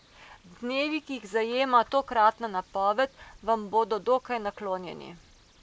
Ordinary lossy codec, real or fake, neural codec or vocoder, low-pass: none; real; none; none